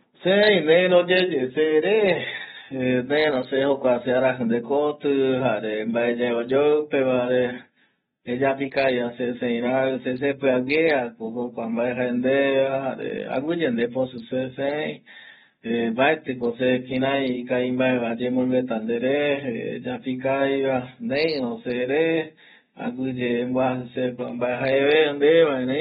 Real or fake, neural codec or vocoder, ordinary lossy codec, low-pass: real; none; AAC, 16 kbps; 7.2 kHz